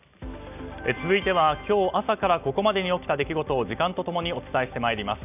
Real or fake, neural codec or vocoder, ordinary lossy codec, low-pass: real; none; none; 3.6 kHz